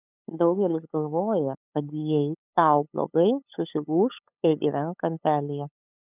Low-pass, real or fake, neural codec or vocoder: 3.6 kHz; fake; codec, 16 kHz, 8 kbps, FunCodec, trained on LibriTTS, 25 frames a second